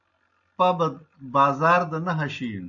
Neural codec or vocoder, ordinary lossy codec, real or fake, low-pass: none; AAC, 64 kbps; real; 7.2 kHz